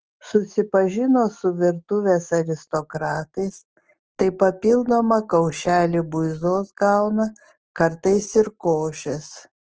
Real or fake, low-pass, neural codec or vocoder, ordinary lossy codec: fake; 7.2 kHz; autoencoder, 48 kHz, 128 numbers a frame, DAC-VAE, trained on Japanese speech; Opus, 16 kbps